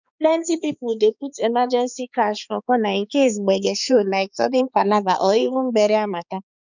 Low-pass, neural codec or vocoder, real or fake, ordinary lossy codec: 7.2 kHz; codec, 16 kHz, 4 kbps, X-Codec, HuBERT features, trained on balanced general audio; fake; none